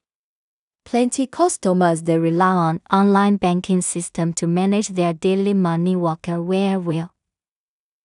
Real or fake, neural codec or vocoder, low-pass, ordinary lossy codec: fake; codec, 16 kHz in and 24 kHz out, 0.4 kbps, LongCat-Audio-Codec, two codebook decoder; 10.8 kHz; none